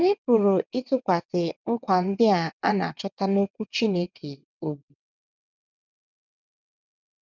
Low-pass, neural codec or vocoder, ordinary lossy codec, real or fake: 7.2 kHz; vocoder, 22.05 kHz, 80 mel bands, WaveNeXt; none; fake